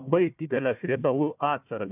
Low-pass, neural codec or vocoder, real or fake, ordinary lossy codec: 3.6 kHz; codec, 16 kHz, 1 kbps, FunCodec, trained on Chinese and English, 50 frames a second; fake; AAC, 32 kbps